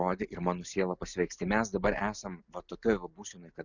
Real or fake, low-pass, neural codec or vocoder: real; 7.2 kHz; none